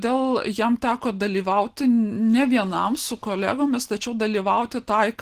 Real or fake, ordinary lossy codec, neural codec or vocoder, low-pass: real; Opus, 16 kbps; none; 14.4 kHz